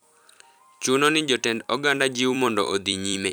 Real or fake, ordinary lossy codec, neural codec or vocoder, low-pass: fake; none; vocoder, 44.1 kHz, 128 mel bands every 256 samples, BigVGAN v2; none